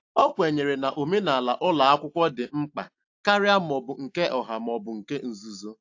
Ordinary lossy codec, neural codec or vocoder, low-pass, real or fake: AAC, 48 kbps; none; 7.2 kHz; real